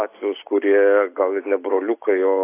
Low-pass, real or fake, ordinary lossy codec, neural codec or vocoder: 3.6 kHz; real; AAC, 24 kbps; none